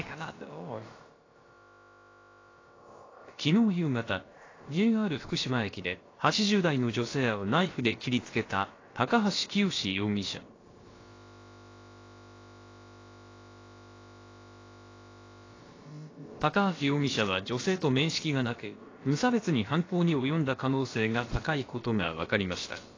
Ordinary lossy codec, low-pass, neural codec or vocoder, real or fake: AAC, 32 kbps; 7.2 kHz; codec, 16 kHz, about 1 kbps, DyCAST, with the encoder's durations; fake